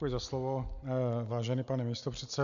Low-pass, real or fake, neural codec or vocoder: 7.2 kHz; real; none